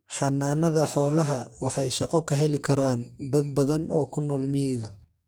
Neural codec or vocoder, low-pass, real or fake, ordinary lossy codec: codec, 44.1 kHz, 2.6 kbps, DAC; none; fake; none